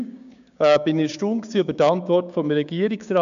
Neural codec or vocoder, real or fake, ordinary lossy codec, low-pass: codec, 16 kHz, 6 kbps, DAC; fake; none; 7.2 kHz